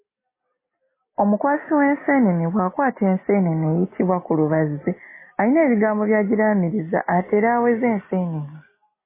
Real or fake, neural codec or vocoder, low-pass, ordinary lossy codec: real; none; 3.6 kHz; MP3, 16 kbps